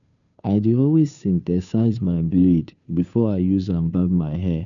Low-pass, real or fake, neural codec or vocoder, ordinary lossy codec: 7.2 kHz; fake; codec, 16 kHz, 2 kbps, FunCodec, trained on Chinese and English, 25 frames a second; none